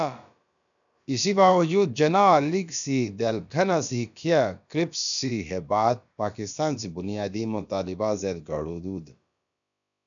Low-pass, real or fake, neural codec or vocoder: 7.2 kHz; fake; codec, 16 kHz, about 1 kbps, DyCAST, with the encoder's durations